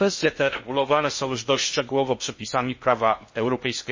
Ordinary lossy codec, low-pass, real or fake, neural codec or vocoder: MP3, 32 kbps; 7.2 kHz; fake; codec, 16 kHz in and 24 kHz out, 0.6 kbps, FocalCodec, streaming, 2048 codes